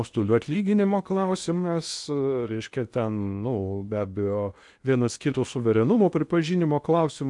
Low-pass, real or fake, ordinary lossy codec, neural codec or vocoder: 10.8 kHz; fake; MP3, 96 kbps; codec, 16 kHz in and 24 kHz out, 0.8 kbps, FocalCodec, streaming, 65536 codes